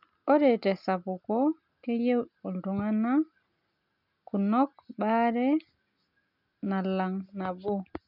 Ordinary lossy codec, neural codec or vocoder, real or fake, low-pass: none; none; real; 5.4 kHz